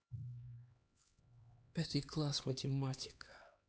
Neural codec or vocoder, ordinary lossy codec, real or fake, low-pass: codec, 16 kHz, 2 kbps, X-Codec, HuBERT features, trained on LibriSpeech; none; fake; none